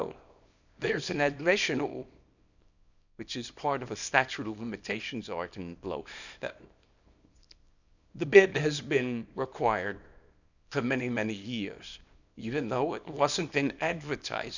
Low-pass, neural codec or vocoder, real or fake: 7.2 kHz; codec, 24 kHz, 0.9 kbps, WavTokenizer, small release; fake